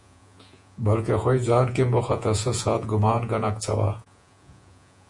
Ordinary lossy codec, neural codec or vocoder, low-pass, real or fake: MP3, 64 kbps; vocoder, 48 kHz, 128 mel bands, Vocos; 10.8 kHz; fake